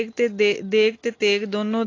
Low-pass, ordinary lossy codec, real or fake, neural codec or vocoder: 7.2 kHz; AAC, 32 kbps; real; none